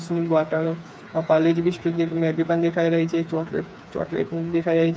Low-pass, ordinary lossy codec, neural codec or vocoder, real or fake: none; none; codec, 16 kHz, 4 kbps, FreqCodec, smaller model; fake